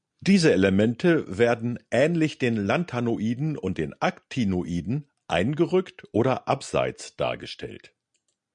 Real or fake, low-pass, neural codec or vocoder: real; 9.9 kHz; none